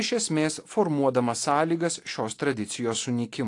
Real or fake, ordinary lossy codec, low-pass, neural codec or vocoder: real; AAC, 48 kbps; 10.8 kHz; none